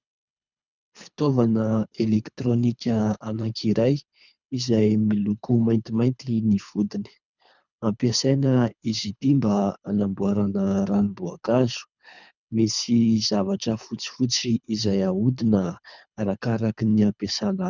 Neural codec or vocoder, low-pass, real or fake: codec, 24 kHz, 3 kbps, HILCodec; 7.2 kHz; fake